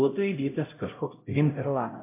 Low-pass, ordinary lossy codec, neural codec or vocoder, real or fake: 3.6 kHz; MP3, 24 kbps; codec, 16 kHz, 0.5 kbps, X-Codec, HuBERT features, trained on LibriSpeech; fake